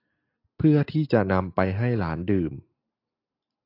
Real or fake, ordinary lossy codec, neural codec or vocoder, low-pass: real; AAC, 32 kbps; none; 5.4 kHz